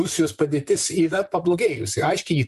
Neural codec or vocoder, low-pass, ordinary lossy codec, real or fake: vocoder, 44.1 kHz, 128 mel bands, Pupu-Vocoder; 14.4 kHz; MP3, 64 kbps; fake